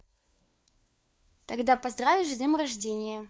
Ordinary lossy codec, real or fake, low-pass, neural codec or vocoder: none; fake; none; codec, 16 kHz, 4 kbps, FunCodec, trained on LibriTTS, 50 frames a second